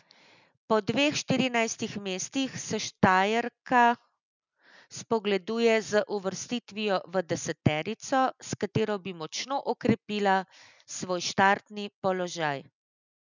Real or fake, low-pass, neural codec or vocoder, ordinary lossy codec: real; 7.2 kHz; none; none